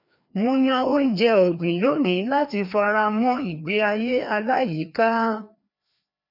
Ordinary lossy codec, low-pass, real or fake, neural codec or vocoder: none; 5.4 kHz; fake; codec, 16 kHz, 2 kbps, FreqCodec, larger model